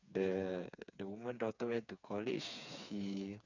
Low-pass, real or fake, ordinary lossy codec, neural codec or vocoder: 7.2 kHz; fake; none; codec, 16 kHz, 4 kbps, FreqCodec, smaller model